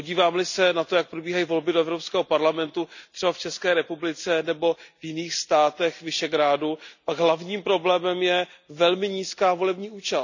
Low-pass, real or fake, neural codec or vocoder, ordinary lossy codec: 7.2 kHz; real; none; none